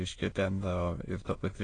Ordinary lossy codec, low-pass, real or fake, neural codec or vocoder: AAC, 32 kbps; 9.9 kHz; fake; autoencoder, 22.05 kHz, a latent of 192 numbers a frame, VITS, trained on many speakers